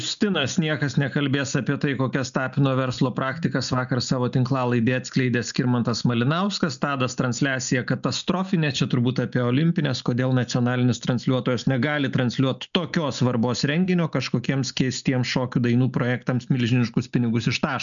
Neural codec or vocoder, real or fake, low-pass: none; real; 7.2 kHz